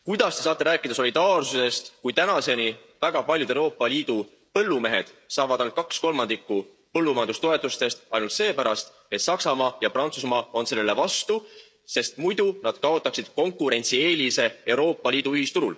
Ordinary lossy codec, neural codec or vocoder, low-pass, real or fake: none; codec, 16 kHz, 16 kbps, FreqCodec, smaller model; none; fake